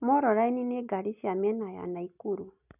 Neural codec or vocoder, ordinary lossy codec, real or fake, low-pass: none; none; real; 3.6 kHz